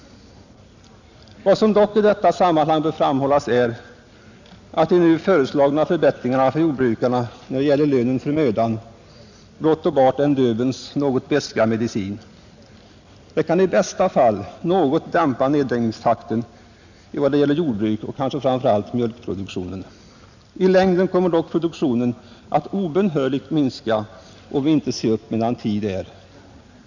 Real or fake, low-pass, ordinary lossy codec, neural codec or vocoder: real; 7.2 kHz; none; none